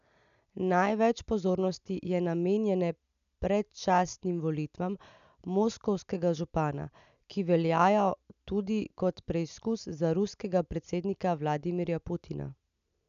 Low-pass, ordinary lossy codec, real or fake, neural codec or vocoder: 7.2 kHz; none; real; none